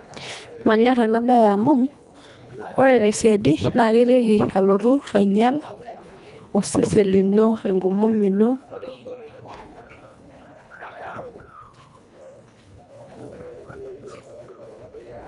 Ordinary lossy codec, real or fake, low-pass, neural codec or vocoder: none; fake; 10.8 kHz; codec, 24 kHz, 1.5 kbps, HILCodec